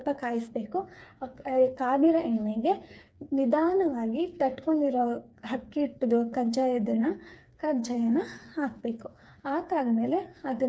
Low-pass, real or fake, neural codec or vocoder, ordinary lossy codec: none; fake; codec, 16 kHz, 4 kbps, FreqCodec, smaller model; none